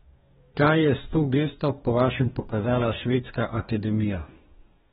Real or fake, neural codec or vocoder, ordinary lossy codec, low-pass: fake; codec, 44.1 kHz, 2.6 kbps, DAC; AAC, 16 kbps; 19.8 kHz